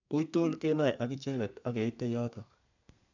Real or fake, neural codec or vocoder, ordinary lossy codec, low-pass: fake; codec, 32 kHz, 1.9 kbps, SNAC; none; 7.2 kHz